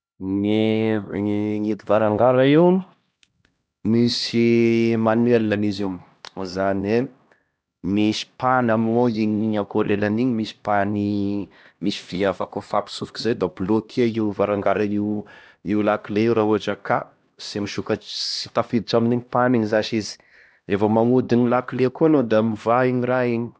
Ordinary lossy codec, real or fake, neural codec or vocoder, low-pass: none; fake; codec, 16 kHz, 1 kbps, X-Codec, HuBERT features, trained on LibriSpeech; none